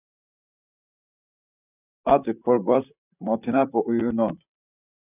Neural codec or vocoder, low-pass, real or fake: vocoder, 44.1 kHz, 128 mel bands, Pupu-Vocoder; 3.6 kHz; fake